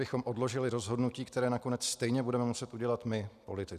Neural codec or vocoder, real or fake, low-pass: none; real; 14.4 kHz